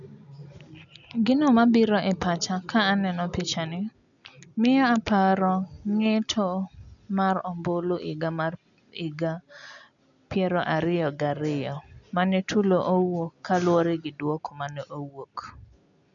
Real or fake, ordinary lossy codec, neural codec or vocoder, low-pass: real; none; none; 7.2 kHz